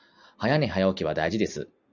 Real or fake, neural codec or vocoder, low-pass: real; none; 7.2 kHz